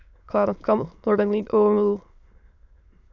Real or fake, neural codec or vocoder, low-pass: fake; autoencoder, 22.05 kHz, a latent of 192 numbers a frame, VITS, trained on many speakers; 7.2 kHz